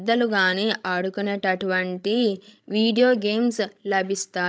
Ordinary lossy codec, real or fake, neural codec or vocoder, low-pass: none; fake; codec, 16 kHz, 16 kbps, FreqCodec, larger model; none